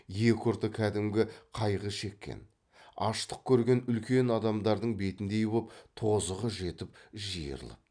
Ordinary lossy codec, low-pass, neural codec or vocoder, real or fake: none; 9.9 kHz; none; real